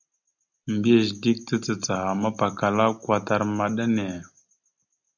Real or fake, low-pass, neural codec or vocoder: real; 7.2 kHz; none